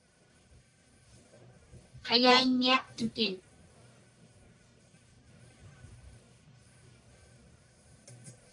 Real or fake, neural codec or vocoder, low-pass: fake; codec, 44.1 kHz, 1.7 kbps, Pupu-Codec; 10.8 kHz